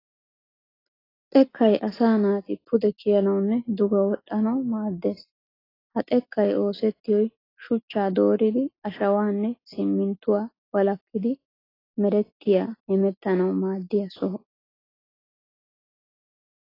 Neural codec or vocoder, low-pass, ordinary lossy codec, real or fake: none; 5.4 kHz; AAC, 24 kbps; real